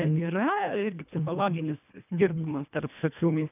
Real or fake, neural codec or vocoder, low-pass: fake; codec, 24 kHz, 1.5 kbps, HILCodec; 3.6 kHz